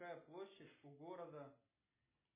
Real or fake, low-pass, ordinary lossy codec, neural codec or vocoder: real; 3.6 kHz; MP3, 16 kbps; none